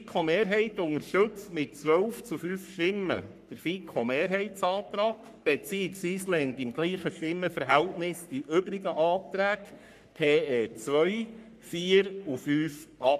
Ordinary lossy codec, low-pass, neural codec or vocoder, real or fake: none; 14.4 kHz; codec, 44.1 kHz, 3.4 kbps, Pupu-Codec; fake